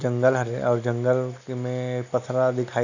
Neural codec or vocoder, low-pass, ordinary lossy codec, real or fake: none; 7.2 kHz; none; real